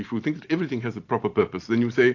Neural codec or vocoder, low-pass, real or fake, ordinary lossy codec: vocoder, 44.1 kHz, 128 mel bands every 512 samples, BigVGAN v2; 7.2 kHz; fake; AAC, 48 kbps